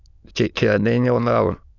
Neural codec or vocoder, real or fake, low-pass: autoencoder, 22.05 kHz, a latent of 192 numbers a frame, VITS, trained on many speakers; fake; 7.2 kHz